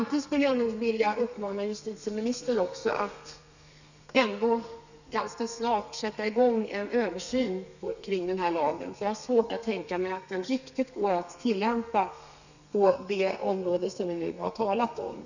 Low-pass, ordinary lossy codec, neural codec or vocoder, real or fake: 7.2 kHz; none; codec, 32 kHz, 1.9 kbps, SNAC; fake